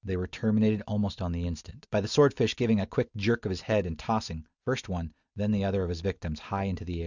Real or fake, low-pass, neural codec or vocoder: real; 7.2 kHz; none